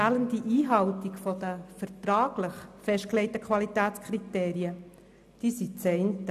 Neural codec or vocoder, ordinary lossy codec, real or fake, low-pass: none; none; real; 14.4 kHz